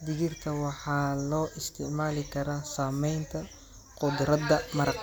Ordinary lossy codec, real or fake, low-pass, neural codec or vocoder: none; real; none; none